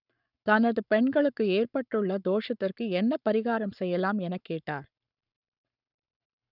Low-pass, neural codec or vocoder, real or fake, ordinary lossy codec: 5.4 kHz; codec, 44.1 kHz, 7.8 kbps, Pupu-Codec; fake; none